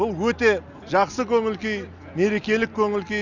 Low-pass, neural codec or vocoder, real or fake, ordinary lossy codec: 7.2 kHz; none; real; none